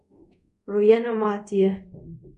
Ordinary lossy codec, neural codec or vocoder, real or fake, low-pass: none; codec, 24 kHz, 0.5 kbps, DualCodec; fake; 10.8 kHz